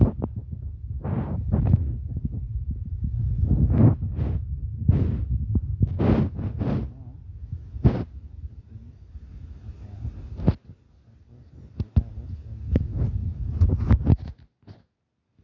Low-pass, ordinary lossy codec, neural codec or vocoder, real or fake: 7.2 kHz; none; none; real